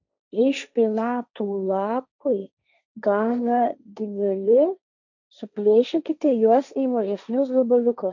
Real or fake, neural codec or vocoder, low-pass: fake; codec, 16 kHz, 1.1 kbps, Voila-Tokenizer; 7.2 kHz